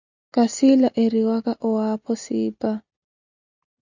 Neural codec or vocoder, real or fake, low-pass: none; real; 7.2 kHz